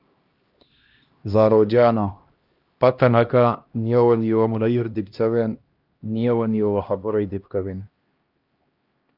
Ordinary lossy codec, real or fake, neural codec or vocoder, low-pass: Opus, 32 kbps; fake; codec, 16 kHz, 1 kbps, X-Codec, HuBERT features, trained on LibriSpeech; 5.4 kHz